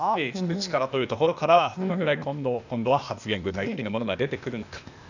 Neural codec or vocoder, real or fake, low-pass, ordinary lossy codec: codec, 16 kHz, 0.8 kbps, ZipCodec; fake; 7.2 kHz; none